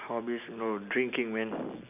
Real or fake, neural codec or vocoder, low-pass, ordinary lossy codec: real; none; 3.6 kHz; none